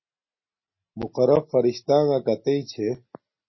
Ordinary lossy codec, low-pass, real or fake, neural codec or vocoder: MP3, 24 kbps; 7.2 kHz; real; none